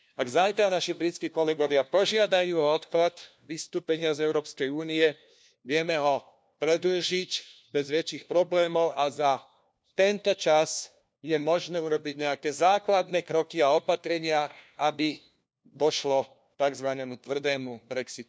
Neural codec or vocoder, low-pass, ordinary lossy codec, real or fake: codec, 16 kHz, 1 kbps, FunCodec, trained on LibriTTS, 50 frames a second; none; none; fake